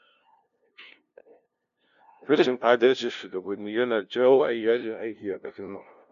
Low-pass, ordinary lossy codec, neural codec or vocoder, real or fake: 7.2 kHz; none; codec, 16 kHz, 0.5 kbps, FunCodec, trained on LibriTTS, 25 frames a second; fake